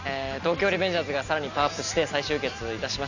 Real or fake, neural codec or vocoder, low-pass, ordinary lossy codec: real; none; 7.2 kHz; none